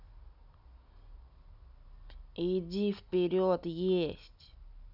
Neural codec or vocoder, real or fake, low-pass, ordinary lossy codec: none; real; 5.4 kHz; none